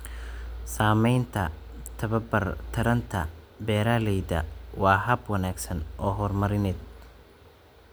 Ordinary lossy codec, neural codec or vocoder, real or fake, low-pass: none; none; real; none